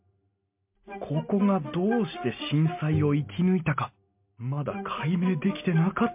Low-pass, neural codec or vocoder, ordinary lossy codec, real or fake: 3.6 kHz; none; MP3, 32 kbps; real